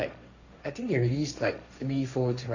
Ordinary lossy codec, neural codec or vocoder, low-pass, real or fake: none; codec, 16 kHz, 1.1 kbps, Voila-Tokenizer; 7.2 kHz; fake